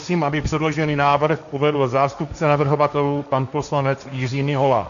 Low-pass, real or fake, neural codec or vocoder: 7.2 kHz; fake; codec, 16 kHz, 1.1 kbps, Voila-Tokenizer